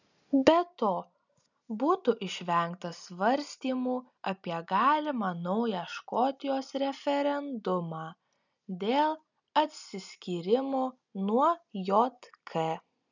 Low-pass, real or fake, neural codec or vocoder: 7.2 kHz; real; none